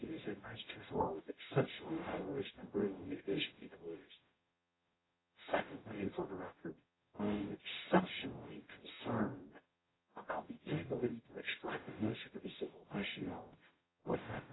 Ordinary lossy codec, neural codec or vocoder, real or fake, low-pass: AAC, 16 kbps; codec, 44.1 kHz, 0.9 kbps, DAC; fake; 7.2 kHz